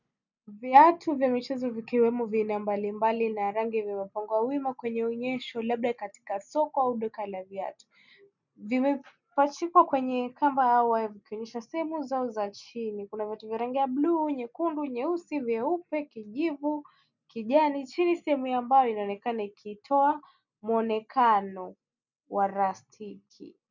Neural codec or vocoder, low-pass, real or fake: none; 7.2 kHz; real